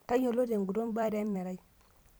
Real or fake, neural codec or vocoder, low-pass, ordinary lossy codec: fake; vocoder, 44.1 kHz, 128 mel bands every 256 samples, BigVGAN v2; none; none